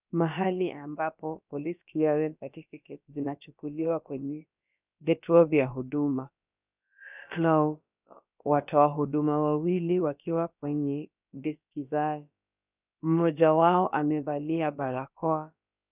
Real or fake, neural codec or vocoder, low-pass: fake; codec, 16 kHz, about 1 kbps, DyCAST, with the encoder's durations; 3.6 kHz